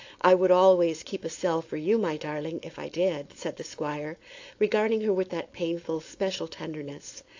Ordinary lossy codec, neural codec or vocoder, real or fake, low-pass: AAC, 48 kbps; codec, 16 kHz, 4.8 kbps, FACodec; fake; 7.2 kHz